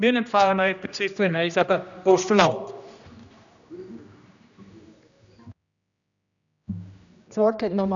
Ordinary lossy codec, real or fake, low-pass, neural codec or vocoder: MP3, 96 kbps; fake; 7.2 kHz; codec, 16 kHz, 1 kbps, X-Codec, HuBERT features, trained on general audio